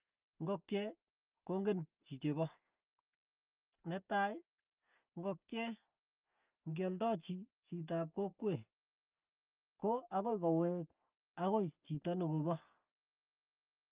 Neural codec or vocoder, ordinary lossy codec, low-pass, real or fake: none; Opus, 24 kbps; 3.6 kHz; real